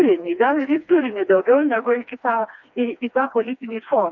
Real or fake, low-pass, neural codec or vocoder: fake; 7.2 kHz; codec, 16 kHz, 2 kbps, FreqCodec, smaller model